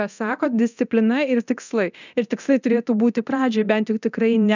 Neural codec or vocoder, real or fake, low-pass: codec, 24 kHz, 0.9 kbps, DualCodec; fake; 7.2 kHz